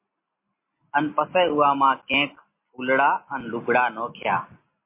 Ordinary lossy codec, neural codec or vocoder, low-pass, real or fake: MP3, 24 kbps; none; 3.6 kHz; real